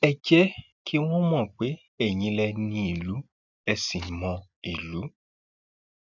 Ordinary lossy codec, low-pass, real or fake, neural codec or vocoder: none; 7.2 kHz; real; none